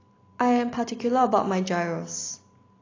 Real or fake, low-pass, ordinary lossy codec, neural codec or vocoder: real; 7.2 kHz; AAC, 32 kbps; none